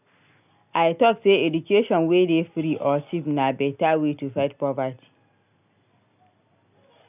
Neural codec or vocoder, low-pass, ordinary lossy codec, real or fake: none; 3.6 kHz; none; real